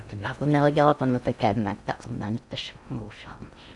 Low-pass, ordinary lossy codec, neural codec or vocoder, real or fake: 10.8 kHz; none; codec, 16 kHz in and 24 kHz out, 0.6 kbps, FocalCodec, streaming, 2048 codes; fake